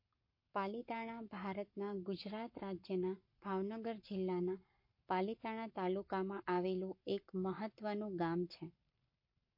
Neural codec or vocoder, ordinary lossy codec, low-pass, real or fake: codec, 44.1 kHz, 7.8 kbps, Pupu-Codec; MP3, 32 kbps; 5.4 kHz; fake